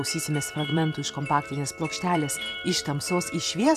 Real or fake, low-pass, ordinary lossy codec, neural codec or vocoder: fake; 14.4 kHz; AAC, 96 kbps; vocoder, 44.1 kHz, 128 mel bands every 256 samples, BigVGAN v2